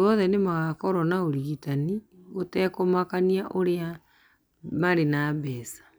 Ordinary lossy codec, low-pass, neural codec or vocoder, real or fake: none; none; none; real